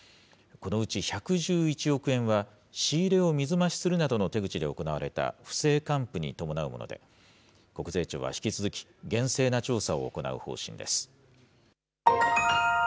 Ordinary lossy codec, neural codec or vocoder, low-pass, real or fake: none; none; none; real